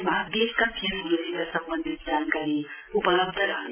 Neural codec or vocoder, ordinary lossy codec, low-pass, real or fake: none; AAC, 24 kbps; 3.6 kHz; real